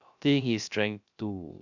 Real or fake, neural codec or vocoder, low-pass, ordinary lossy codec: fake; codec, 16 kHz, 0.3 kbps, FocalCodec; 7.2 kHz; none